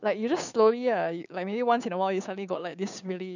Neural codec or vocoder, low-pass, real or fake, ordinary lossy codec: codec, 16 kHz, 6 kbps, DAC; 7.2 kHz; fake; none